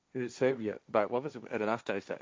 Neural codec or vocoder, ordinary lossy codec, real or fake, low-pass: codec, 16 kHz, 1.1 kbps, Voila-Tokenizer; none; fake; none